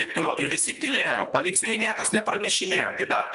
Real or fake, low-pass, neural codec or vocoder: fake; 10.8 kHz; codec, 24 kHz, 1.5 kbps, HILCodec